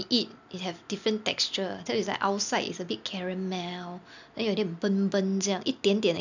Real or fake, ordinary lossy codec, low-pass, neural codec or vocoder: real; none; 7.2 kHz; none